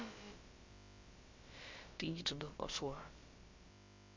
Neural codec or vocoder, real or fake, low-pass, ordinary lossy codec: codec, 16 kHz, about 1 kbps, DyCAST, with the encoder's durations; fake; 7.2 kHz; MP3, 48 kbps